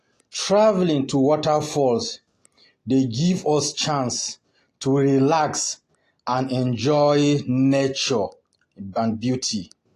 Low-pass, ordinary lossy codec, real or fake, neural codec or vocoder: 14.4 kHz; AAC, 48 kbps; real; none